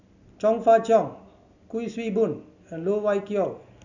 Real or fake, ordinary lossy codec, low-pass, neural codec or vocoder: real; none; 7.2 kHz; none